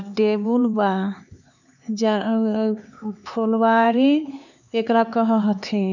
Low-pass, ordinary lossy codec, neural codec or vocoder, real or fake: 7.2 kHz; none; codec, 16 kHz, 4 kbps, X-Codec, HuBERT features, trained on LibriSpeech; fake